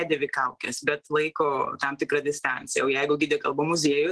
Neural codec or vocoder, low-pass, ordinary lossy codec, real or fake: none; 10.8 kHz; Opus, 16 kbps; real